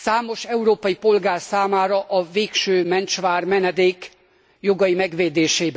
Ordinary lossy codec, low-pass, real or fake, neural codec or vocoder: none; none; real; none